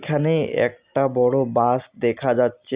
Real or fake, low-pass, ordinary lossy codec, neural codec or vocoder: real; 3.6 kHz; Opus, 64 kbps; none